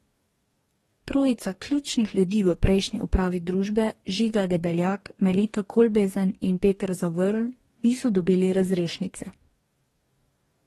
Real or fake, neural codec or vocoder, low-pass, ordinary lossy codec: fake; codec, 44.1 kHz, 2.6 kbps, DAC; 19.8 kHz; AAC, 32 kbps